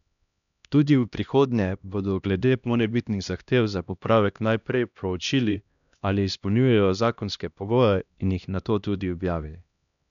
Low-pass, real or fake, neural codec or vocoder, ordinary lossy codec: 7.2 kHz; fake; codec, 16 kHz, 1 kbps, X-Codec, HuBERT features, trained on LibriSpeech; none